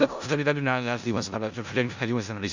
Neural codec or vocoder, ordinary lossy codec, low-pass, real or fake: codec, 16 kHz in and 24 kHz out, 0.4 kbps, LongCat-Audio-Codec, four codebook decoder; Opus, 64 kbps; 7.2 kHz; fake